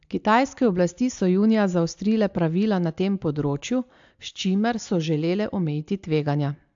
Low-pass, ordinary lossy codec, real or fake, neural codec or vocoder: 7.2 kHz; AAC, 64 kbps; real; none